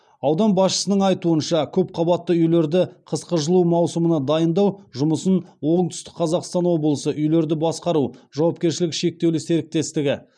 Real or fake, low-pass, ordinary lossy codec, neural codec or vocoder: real; 9.9 kHz; none; none